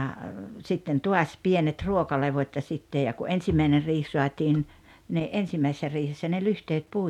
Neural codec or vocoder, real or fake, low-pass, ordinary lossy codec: none; real; 19.8 kHz; none